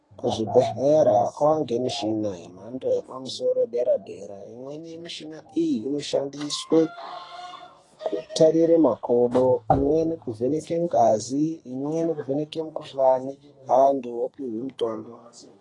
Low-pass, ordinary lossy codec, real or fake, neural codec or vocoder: 10.8 kHz; AAC, 32 kbps; fake; codec, 32 kHz, 1.9 kbps, SNAC